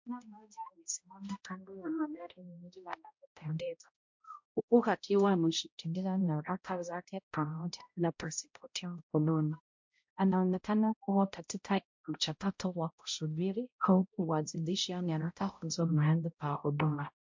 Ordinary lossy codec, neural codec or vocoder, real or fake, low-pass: MP3, 48 kbps; codec, 16 kHz, 0.5 kbps, X-Codec, HuBERT features, trained on balanced general audio; fake; 7.2 kHz